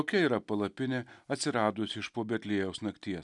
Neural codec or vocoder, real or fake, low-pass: none; real; 10.8 kHz